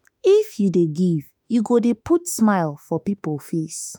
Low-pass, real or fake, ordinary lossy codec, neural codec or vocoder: none; fake; none; autoencoder, 48 kHz, 32 numbers a frame, DAC-VAE, trained on Japanese speech